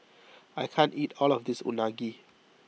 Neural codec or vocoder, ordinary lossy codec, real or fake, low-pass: none; none; real; none